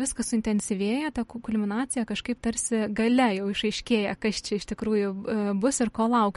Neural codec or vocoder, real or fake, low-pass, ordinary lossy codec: none; real; 19.8 kHz; MP3, 48 kbps